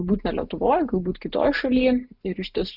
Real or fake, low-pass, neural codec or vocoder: real; 5.4 kHz; none